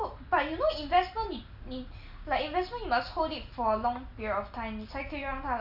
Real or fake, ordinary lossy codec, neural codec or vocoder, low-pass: real; none; none; 5.4 kHz